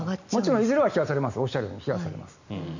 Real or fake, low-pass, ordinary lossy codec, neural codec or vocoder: real; 7.2 kHz; none; none